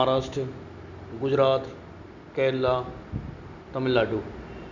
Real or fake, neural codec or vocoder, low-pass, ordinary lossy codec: real; none; 7.2 kHz; none